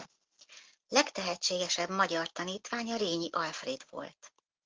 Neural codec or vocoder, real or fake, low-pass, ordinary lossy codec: none; real; 7.2 kHz; Opus, 16 kbps